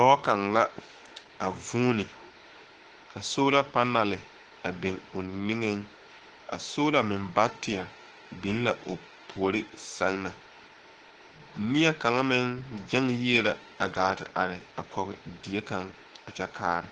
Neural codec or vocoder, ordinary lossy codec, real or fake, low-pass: autoencoder, 48 kHz, 32 numbers a frame, DAC-VAE, trained on Japanese speech; Opus, 16 kbps; fake; 9.9 kHz